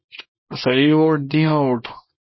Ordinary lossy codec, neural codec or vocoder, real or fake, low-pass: MP3, 24 kbps; codec, 24 kHz, 0.9 kbps, WavTokenizer, small release; fake; 7.2 kHz